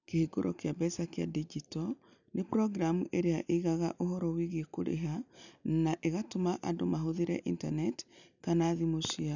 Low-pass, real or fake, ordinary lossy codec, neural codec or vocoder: 7.2 kHz; real; none; none